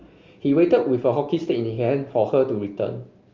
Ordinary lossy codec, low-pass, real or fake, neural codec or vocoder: Opus, 32 kbps; 7.2 kHz; real; none